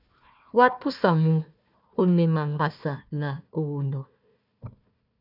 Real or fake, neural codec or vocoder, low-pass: fake; codec, 16 kHz, 1 kbps, FunCodec, trained on Chinese and English, 50 frames a second; 5.4 kHz